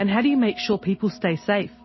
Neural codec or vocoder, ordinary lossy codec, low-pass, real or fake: none; MP3, 24 kbps; 7.2 kHz; real